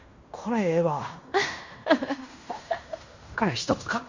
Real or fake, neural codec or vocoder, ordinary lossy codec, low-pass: fake; codec, 16 kHz in and 24 kHz out, 0.9 kbps, LongCat-Audio-Codec, fine tuned four codebook decoder; Opus, 64 kbps; 7.2 kHz